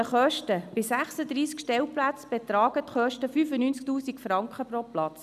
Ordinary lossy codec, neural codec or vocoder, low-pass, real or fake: none; none; 14.4 kHz; real